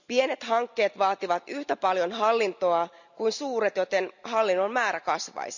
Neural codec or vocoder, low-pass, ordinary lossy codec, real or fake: none; 7.2 kHz; none; real